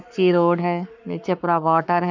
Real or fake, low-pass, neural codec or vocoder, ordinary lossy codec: fake; 7.2 kHz; codec, 44.1 kHz, 7.8 kbps, Pupu-Codec; none